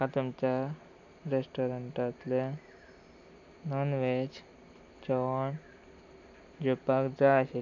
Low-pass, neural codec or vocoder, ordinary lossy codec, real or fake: 7.2 kHz; none; none; real